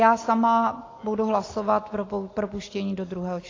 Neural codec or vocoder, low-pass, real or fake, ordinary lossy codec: none; 7.2 kHz; real; AAC, 32 kbps